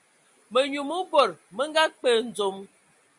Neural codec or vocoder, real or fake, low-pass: none; real; 10.8 kHz